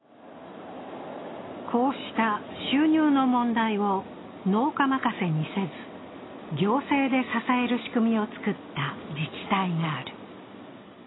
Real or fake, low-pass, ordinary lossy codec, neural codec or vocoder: real; 7.2 kHz; AAC, 16 kbps; none